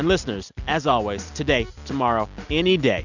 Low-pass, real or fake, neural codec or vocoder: 7.2 kHz; real; none